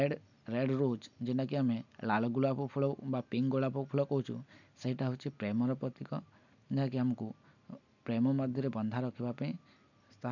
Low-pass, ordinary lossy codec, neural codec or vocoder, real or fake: 7.2 kHz; none; none; real